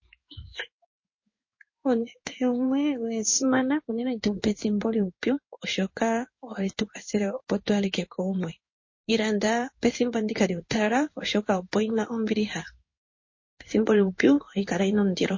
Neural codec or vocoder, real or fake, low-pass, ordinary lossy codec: codec, 16 kHz in and 24 kHz out, 1 kbps, XY-Tokenizer; fake; 7.2 kHz; MP3, 32 kbps